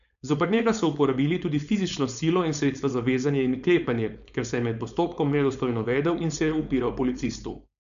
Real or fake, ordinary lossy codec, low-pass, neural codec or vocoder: fake; none; 7.2 kHz; codec, 16 kHz, 4.8 kbps, FACodec